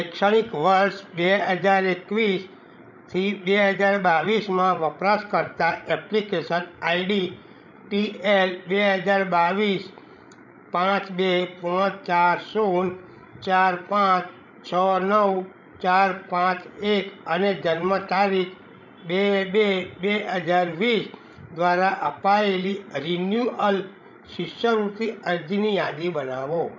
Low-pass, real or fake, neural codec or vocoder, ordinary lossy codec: 7.2 kHz; fake; codec, 16 kHz, 16 kbps, FreqCodec, larger model; none